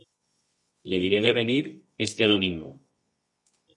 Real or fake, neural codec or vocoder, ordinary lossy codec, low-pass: fake; codec, 24 kHz, 0.9 kbps, WavTokenizer, medium music audio release; MP3, 48 kbps; 10.8 kHz